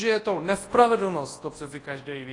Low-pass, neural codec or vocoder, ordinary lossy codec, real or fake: 10.8 kHz; codec, 24 kHz, 0.9 kbps, WavTokenizer, large speech release; AAC, 32 kbps; fake